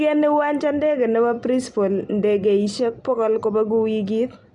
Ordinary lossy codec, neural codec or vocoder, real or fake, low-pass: none; none; real; 10.8 kHz